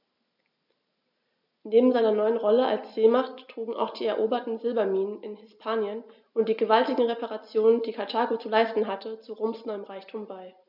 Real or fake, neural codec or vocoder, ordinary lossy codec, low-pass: real; none; none; 5.4 kHz